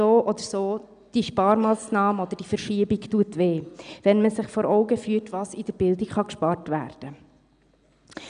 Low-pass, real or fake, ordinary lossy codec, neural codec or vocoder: 9.9 kHz; real; none; none